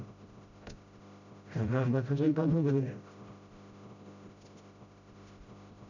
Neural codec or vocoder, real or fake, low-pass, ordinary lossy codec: codec, 16 kHz, 0.5 kbps, FreqCodec, smaller model; fake; 7.2 kHz; none